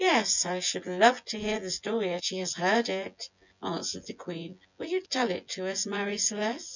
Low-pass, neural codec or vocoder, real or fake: 7.2 kHz; vocoder, 24 kHz, 100 mel bands, Vocos; fake